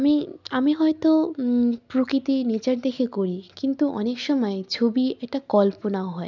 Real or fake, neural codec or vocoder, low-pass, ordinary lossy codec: real; none; 7.2 kHz; none